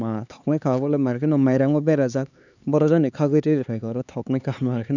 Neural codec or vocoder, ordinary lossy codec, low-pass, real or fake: codec, 16 kHz, 4 kbps, X-Codec, HuBERT features, trained on LibriSpeech; none; 7.2 kHz; fake